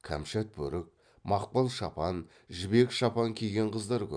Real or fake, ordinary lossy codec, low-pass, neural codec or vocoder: real; MP3, 96 kbps; 9.9 kHz; none